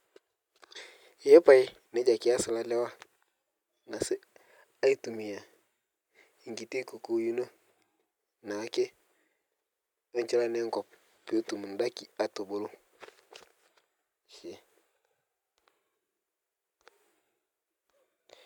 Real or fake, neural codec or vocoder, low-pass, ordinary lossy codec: real; none; 19.8 kHz; none